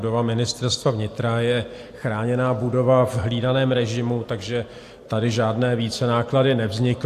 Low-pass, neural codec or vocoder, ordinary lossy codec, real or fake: 14.4 kHz; none; AAC, 64 kbps; real